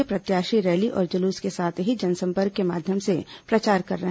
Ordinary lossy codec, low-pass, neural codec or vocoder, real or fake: none; none; none; real